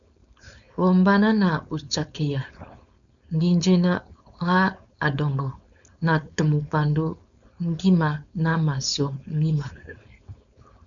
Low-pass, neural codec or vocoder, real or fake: 7.2 kHz; codec, 16 kHz, 4.8 kbps, FACodec; fake